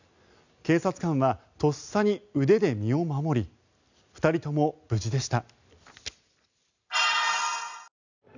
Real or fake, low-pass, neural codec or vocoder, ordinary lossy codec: real; 7.2 kHz; none; none